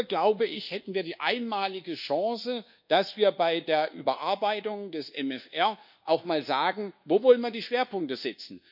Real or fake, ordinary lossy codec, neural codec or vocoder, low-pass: fake; none; codec, 24 kHz, 1.2 kbps, DualCodec; 5.4 kHz